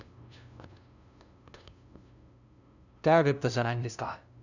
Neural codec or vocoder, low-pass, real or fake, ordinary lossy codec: codec, 16 kHz, 0.5 kbps, FunCodec, trained on LibriTTS, 25 frames a second; 7.2 kHz; fake; none